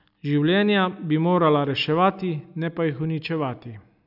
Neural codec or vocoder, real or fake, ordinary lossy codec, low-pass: none; real; none; 5.4 kHz